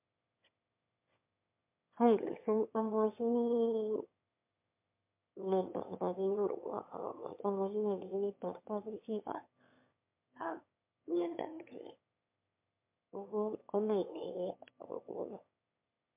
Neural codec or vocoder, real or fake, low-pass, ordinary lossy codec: autoencoder, 22.05 kHz, a latent of 192 numbers a frame, VITS, trained on one speaker; fake; 3.6 kHz; MP3, 32 kbps